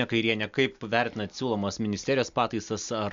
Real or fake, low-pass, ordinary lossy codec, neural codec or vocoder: real; 7.2 kHz; MP3, 64 kbps; none